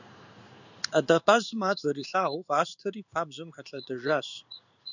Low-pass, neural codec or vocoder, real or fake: 7.2 kHz; codec, 16 kHz in and 24 kHz out, 1 kbps, XY-Tokenizer; fake